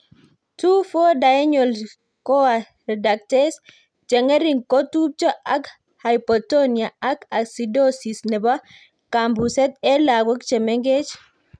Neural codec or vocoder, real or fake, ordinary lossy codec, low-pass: none; real; none; 9.9 kHz